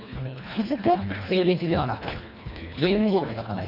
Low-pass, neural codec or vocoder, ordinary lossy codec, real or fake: 5.4 kHz; codec, 24 kHz, 1.5 kbps, HILCodec; none; fake